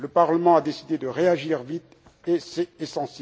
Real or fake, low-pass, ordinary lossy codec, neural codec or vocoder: real; none; none; none